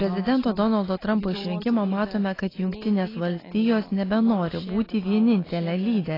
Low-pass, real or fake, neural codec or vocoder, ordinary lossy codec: 5.4 kHz; real; none; AAC, 24 kbps